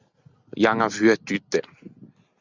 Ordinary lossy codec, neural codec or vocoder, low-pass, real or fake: Opus, 64 kbps; none; 7.2 kHz; real